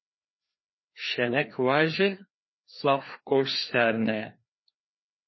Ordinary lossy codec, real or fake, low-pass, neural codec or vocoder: MP3, 24 kbps; fake; 7.2 kHz; codec, 16 kHz, 2 kbps, FreqCodec, larger model